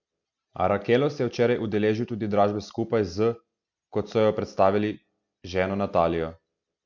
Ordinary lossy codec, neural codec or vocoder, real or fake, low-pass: none; none; real; 7.2 kHz